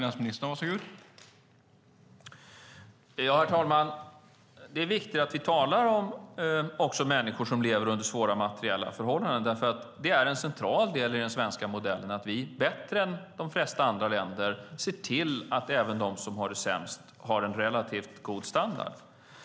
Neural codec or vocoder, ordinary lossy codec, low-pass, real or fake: none; none; none; real